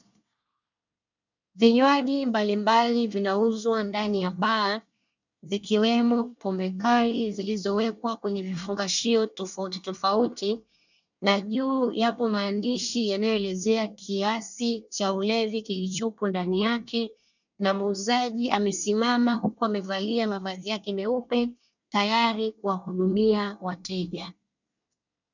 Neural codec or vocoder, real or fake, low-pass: codec, 24 kHz, 1 kbps, SNAC; fake; 7.2 kHz